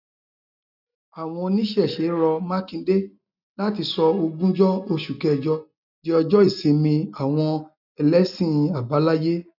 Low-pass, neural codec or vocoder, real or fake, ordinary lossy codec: 5.4 kHz; none; real; none